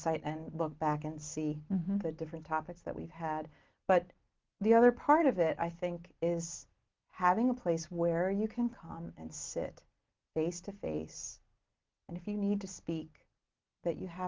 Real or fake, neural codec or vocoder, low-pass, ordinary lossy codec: real; none; 7.2 kHz; Opus, 16 kbps